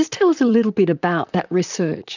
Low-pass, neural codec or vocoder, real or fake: 7.2 kHz; vocoder, 44.1 kHz, 128 mel bands, Pupu-Vocoder; fake